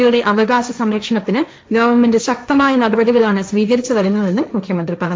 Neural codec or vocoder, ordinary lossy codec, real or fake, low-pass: codec, 16 kHz, 1.1 kbps, Voila-Tokenizer; none; fake; none